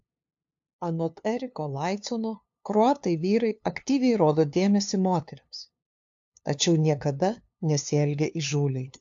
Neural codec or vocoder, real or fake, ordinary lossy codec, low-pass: codec, 16 kHz, 2 kbps, FunCodec, trained on LibriTTS, 25 frames a second; fake; AAC, 64 kbps; 7.2 kHz